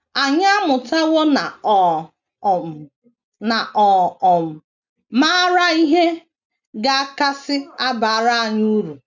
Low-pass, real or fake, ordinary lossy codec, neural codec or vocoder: 7.2 kHz; real; none; none